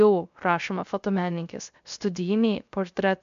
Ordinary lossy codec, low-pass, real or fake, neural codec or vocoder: MP3, 64 kbps; 7.2 kHz; fake; codec, 16 kHz, 0.3 kbps, FocalCodec